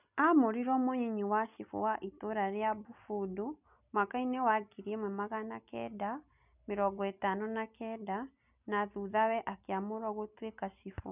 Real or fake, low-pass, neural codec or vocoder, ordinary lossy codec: real; 3.6 kHz; none; none